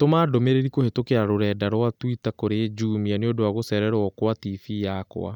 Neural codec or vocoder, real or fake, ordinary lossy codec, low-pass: none; real; none; 19.8 kHz